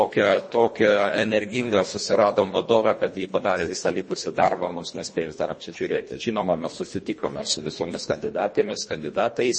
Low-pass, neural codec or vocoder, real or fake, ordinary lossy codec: 9.9 kHz; codec, 24 kHz, 1.5 kbps, HILCodec; fake; MP3, 32 kbps